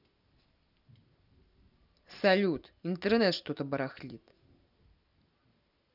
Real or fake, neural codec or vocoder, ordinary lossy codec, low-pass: real; none; none; 5.4 kHz